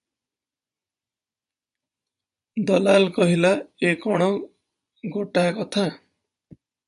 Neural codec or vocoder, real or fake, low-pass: vocoder, 24 kHz, 100 mel bands, Vocos; fake; 10.8 kHz